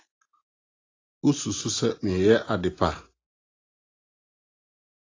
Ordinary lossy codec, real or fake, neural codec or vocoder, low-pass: AAC, 32 kbps; real; none; 7.2 kHz